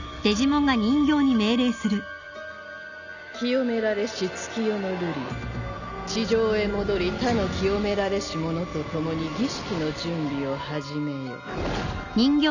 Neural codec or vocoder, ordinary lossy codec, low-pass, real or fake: none; none; 7.2 kHz; real